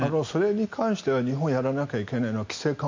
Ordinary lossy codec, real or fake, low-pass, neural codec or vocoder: MP3, 64 kbps; real; 7.2 kHz; none